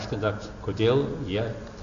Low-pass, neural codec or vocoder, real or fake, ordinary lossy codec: 7.2 kHz; none; real; AAC, 48 kbps